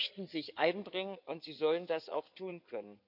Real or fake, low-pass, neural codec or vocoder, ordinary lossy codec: fake; 5.4 kHz; codec, 16 kHz in and 24 kHz out, 2.2 kbps, FireRedTTS-2 codec; none